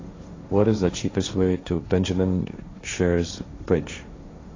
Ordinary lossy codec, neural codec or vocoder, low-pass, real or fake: AAC, 32 kbps; codec, 16 kHz, 1.1 kbps, Voila-Tokenizer; 7.2 kHz; fake